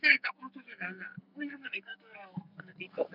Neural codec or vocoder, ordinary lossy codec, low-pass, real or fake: codec, 44.1 kHz, 2.6 kbps, SNAC; none; 5.4 kHz; fake